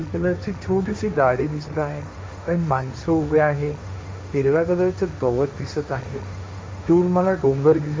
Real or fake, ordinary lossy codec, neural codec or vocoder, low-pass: fake; none; codec, 16 kHz, 1.1 kbps, Voila-Tokenizer; none